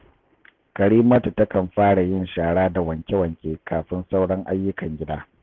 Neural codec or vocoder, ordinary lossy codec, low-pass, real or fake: none; Opus, 16 kbps; 7.2 kHz; real